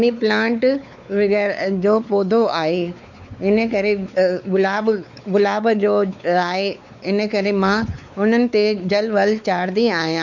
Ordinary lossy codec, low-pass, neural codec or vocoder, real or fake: none; 7.2 kHz; codec, 16 kHz, 4 kbps, X-Codec, WavLM features, trained on Multilingual LibriSpeech; fake